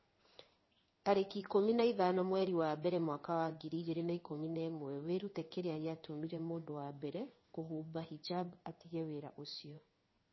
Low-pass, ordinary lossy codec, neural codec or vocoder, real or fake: 7.2 kHz; MP3, 24 kbps; codec, 16 kHz in and 24 kHz out, 1 kbps, XY-Tokenizer; fake